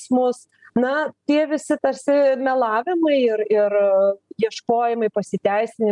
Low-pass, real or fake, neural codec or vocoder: 10.8 kHz; real; none